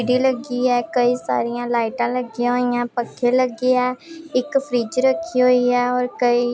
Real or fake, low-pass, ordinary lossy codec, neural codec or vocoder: real; none; none; none